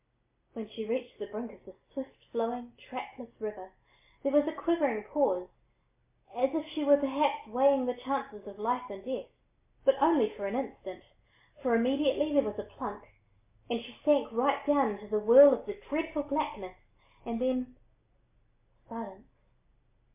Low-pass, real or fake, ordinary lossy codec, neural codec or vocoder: 3.6 kHz; real; MP3, 32 kbps; none